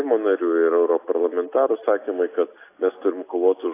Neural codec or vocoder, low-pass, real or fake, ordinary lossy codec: none; 3.6 kHz; real; AAC, 24 kbps